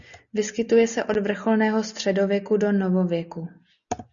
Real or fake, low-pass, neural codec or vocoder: real; 7.2 kHz; none